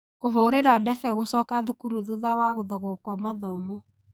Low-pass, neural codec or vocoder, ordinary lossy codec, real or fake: none; codec, 44.1 kHz, 2.6 kbps, SNAC; none; fake